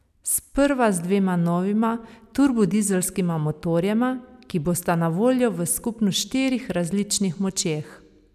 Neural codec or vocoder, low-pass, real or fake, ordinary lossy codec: none; 14.4 kHz; real; none